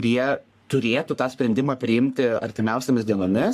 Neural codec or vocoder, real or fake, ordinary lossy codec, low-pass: codec, 44.1 kHz, 3.4 kbps, Pupu-Codec; fake; AAC, 96 kbps; 14.4 kHz